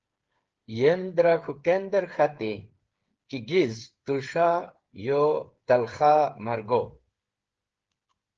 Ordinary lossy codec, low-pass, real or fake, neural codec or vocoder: Opus, 16 kbps; 7.2 kHz; fake; codec, 16 kHz, 8 kbps, FreqCodec, smaller model